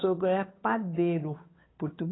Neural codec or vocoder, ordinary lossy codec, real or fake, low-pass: codec, 16 kHz, 8 kbps, FunCodec, trained on Chinese and English, 25 frames a second; AAC, 16 kbps; fake; 7.2 kHz